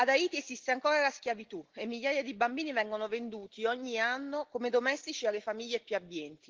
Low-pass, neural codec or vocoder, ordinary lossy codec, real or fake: 7.2 kHz; none; Opus, 16 kbps; real